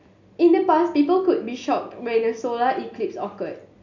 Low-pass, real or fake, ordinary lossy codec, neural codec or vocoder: 7.2 kHz; real; none; none